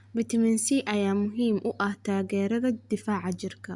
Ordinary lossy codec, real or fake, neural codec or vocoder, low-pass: Opus, 64 kbps; real; none; 10.8 kHz